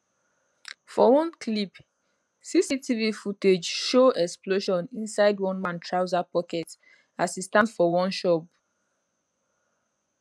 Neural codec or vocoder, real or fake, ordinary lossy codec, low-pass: vocoder, 24 kHz, 100 mel bands, Vocos; fake; none; none